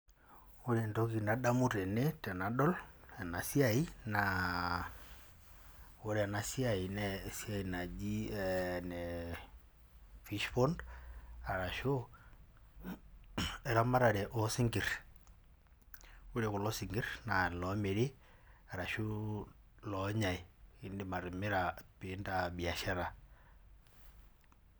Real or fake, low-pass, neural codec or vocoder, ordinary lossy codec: real; none; none; none